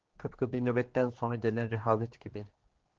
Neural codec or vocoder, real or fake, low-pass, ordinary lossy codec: codec, 16 kHz, 2 kbps, X-Codec, HuBERT features, trained on general audio; fake; 7.2 kHz; Opus, 16 kbps